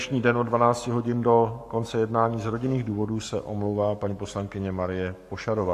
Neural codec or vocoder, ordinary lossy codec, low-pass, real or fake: codec, 44.1 kHz, 7.8 kbps, Pupu-Codec; AAC, 64 kbps; 14.4 kHz; fake